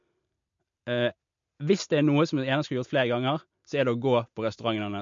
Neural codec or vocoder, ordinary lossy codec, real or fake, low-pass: none; MP3, 48 kbps; real; 7.2 kHz